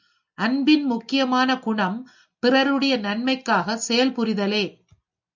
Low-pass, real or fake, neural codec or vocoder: 7.2 kHz; real; none